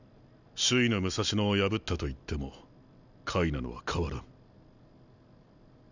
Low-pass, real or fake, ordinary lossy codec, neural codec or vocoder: 7.2 kHz; real; none; none